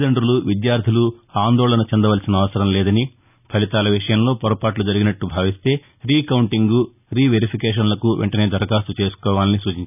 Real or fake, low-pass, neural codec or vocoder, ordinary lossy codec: real; 3.6 kHz; none; none